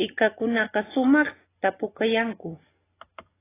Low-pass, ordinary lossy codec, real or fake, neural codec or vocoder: 3.6 kHz; AAC, 16 kbps; real; none